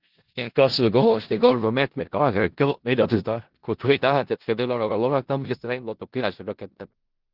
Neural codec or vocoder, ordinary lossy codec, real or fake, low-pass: codec, 16 kHz in and 24 kHz out, 0.4 kbps, LongCat-Audio-Codec, four codebook decoder; Opus, 16 kbps; fake; 5.4 kHz